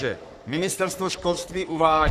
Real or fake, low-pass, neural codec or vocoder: fake; 14.4 kHz; codec, 44.1 kHz, 3.4 kbps, Pupu-Codec